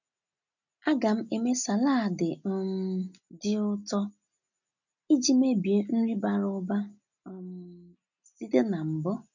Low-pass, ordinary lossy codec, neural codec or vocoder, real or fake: 7.2 kHz; none; none; real